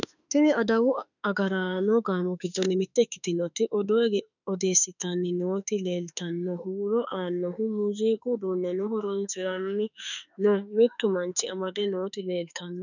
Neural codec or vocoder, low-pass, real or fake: autoencoder, 48 kHz, 32 numbers a frame, DAC-VAE, trained on Japanese speech; 7.2 kHz; fake